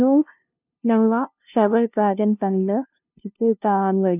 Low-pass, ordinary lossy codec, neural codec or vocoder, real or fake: 3.6 kHz; none; codec, 16 kHz, 0.5 kbps, FunCodec, trained on LibriTTS, 25 frames a second; fake